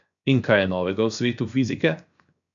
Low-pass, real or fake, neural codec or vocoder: 7.2 kHz; fake; codec, 16 kHz, 0.7 kbps, FocalCodec